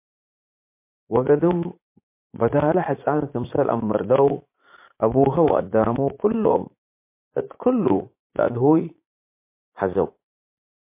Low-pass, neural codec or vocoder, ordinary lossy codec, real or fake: 3.6 kHz; vocoder, 44.1 kHz, 80 mel bands, Vocos; MP3, 24 kbps; fake